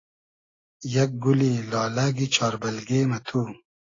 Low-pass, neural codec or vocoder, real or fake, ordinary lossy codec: 7.2 kHz; none; real; AAC, 32 kbps